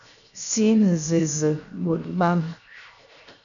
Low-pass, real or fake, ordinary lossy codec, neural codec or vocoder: 7.2 kHz; fake; MP3, 96 kbps; codec, 16 kHz, 0.7 kbps, FocalCodec